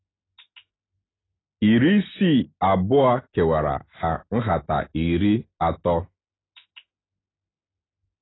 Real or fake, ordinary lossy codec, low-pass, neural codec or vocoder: real; AAC, 16 kbps; 7.2 kHz; none